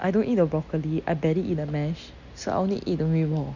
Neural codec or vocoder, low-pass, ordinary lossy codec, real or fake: none; 7.2 kHz; none; real